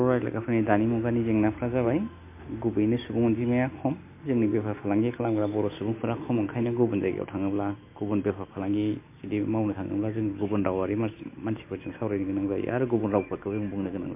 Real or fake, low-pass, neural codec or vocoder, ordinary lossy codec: real; 3.6 kHz; none; MP3, 24 kbps